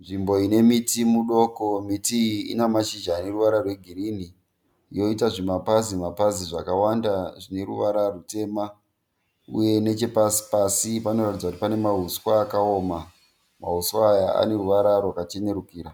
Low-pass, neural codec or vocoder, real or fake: 19.8 kHz; none; real